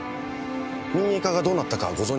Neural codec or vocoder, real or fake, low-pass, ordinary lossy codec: none; real; none; none